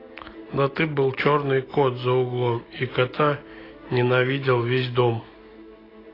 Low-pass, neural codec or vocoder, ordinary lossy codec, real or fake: 5.4 kHz; none; AAC, 24 kbps; real